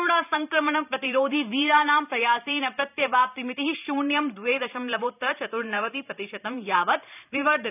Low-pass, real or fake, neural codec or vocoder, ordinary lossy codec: 3.6 kHz; fake; vocoder, 44.1 kHz, 128 mel bands every 512 samples, BigVGAN v2; none